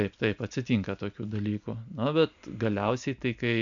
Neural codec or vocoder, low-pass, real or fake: none; 7.2 kHz; real